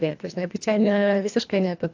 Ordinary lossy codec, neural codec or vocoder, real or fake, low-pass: AAC, 48 kbps; codec, 24 kHz, 1.5 kbps, HILCodec; fake; 7.2 kHz